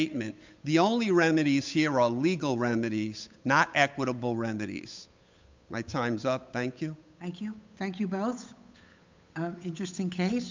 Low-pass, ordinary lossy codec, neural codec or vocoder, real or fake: 7.2 kHz; MP3, 64 kbps; codec, 16 kHz, 8 kbps, FunCodec, trained on Chinese and English, 25 frames a second; fake